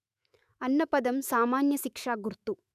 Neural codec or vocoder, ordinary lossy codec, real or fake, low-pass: autoencoder, 48 kHz, 128 numbers a frame, DAC-VAE, trained on Japanese speech; none; fake; 14.4 kHz